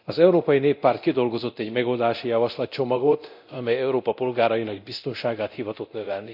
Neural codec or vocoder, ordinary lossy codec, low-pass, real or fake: codec, 24 kHz, 0.9 kbps, DualCodec; none; 5.4 kHz; fake